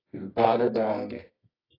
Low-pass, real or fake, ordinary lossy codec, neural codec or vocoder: 5.4 kHz; fake; AAC, 24 kbps; codec, 24 kHz, 0.9 kbps, WavTokenizer, medium music audio release